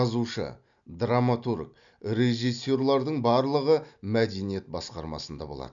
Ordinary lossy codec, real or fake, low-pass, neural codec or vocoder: none; real; 7.2 kHz; none